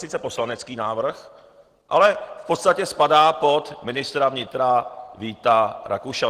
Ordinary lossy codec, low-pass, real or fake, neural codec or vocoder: Opus, 16 kbps; 14.4 kHz; real; none